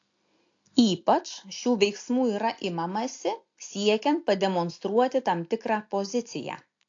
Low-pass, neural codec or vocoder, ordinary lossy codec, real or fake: 7.2 kHz; none; AAC, 48 kbps; real